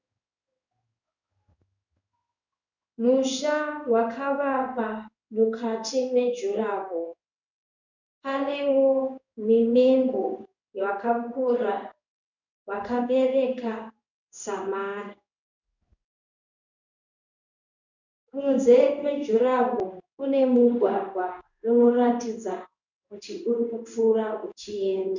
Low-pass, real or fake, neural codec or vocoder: 7.2 kHz; fake; codec, 16 kHz in and 24 kHz out, 1 kbps, XY-Tokenizer